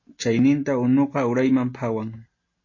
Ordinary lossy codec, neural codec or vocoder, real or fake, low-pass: MP3, 32 kbps; none; real; 7.2 kHz